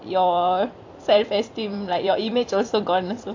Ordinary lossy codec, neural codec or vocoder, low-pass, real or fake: AAC, 48 kbps; vocoder, 44.1 kHz, 128 mel bands every 256 samples, BigVGAN v2; 7.2 kHz; fake